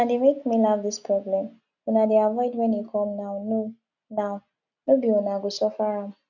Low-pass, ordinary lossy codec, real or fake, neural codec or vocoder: 7.2 kHz; none; real; none